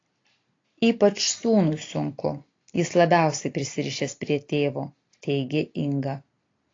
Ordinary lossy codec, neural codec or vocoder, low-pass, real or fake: AAC, 32 kbps; none; 7.2 kHz; real